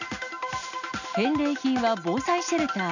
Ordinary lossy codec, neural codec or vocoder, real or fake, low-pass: none; none; real; 7.2 kHz